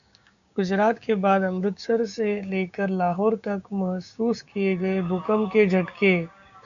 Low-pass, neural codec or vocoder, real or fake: 7.2 kHz; codec, 16 kHz, 6 kbps, DAC; fake